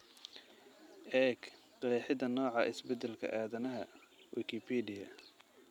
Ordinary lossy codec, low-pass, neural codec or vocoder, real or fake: none; 19.8 kHz; none; real